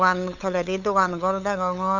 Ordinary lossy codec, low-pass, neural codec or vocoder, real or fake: none; 7.2 kHz; codec, 16 kHz, 8 kbps, FunCodec, trained on LibriTTS, 25 frames a second; fake